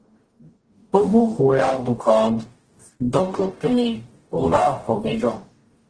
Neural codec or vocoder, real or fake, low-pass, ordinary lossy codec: codec, 44.1 kHz, 0.9 kbps, DAC; fake; 9.9 kHz; Opus, 16 kbps